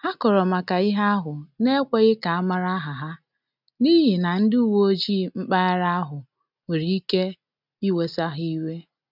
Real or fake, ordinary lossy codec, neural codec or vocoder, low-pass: real; none; none; 5.4 kHz